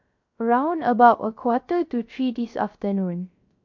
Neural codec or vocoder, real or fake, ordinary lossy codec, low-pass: codec, 16 kHz, 0.3 kbps, FocalCodec; fake; MP3, 48 kbps; 7.2 kHz